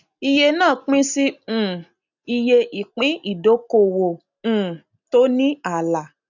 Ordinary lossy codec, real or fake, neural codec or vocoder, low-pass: none; real; none; 7.2 kHz